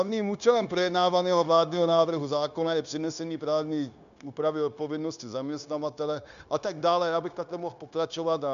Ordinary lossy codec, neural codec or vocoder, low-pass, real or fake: MP3, 96 kbps; codec, 16 kHz, 0.9 kbps, LongCat-Audio-Codec; 7.2 kHz; fake